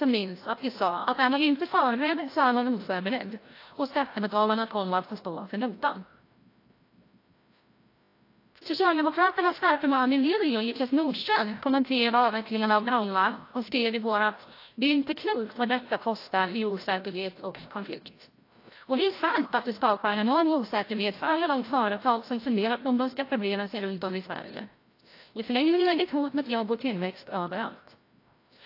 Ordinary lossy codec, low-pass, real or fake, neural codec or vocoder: AAC, 32 kbps; 5.4 kHz; fake; codec, 16 kHz, 0.5 kbps, FreqCodec, larger model